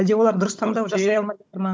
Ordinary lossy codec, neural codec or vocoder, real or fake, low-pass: none; codec, 16 kHz, 16 kbps, FunCodec, trained on Chinese and English, 50 frames a second; fake; none